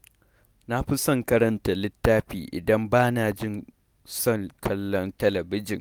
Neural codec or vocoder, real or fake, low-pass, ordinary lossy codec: none; real; none; none